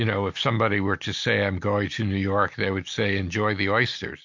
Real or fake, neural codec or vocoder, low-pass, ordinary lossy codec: real; none; 7.2 kHz; MP3, 48 kbps